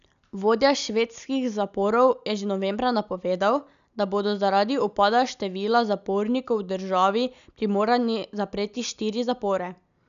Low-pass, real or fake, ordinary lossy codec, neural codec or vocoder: 7.2 kHz; real; none; none